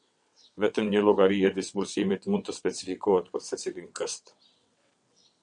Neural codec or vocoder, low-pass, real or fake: vocoder, 22.05 kHz, 80 mel bands, WaveNeXt; 9.9 kHz; fake